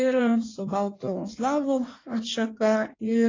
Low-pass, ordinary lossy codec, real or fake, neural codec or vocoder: 7.2 kHz; AAC, 32 kbps; fake; codec, 16 kHz in and 24 kHz out, 1.1 kbps, FireRedTTS-2 codec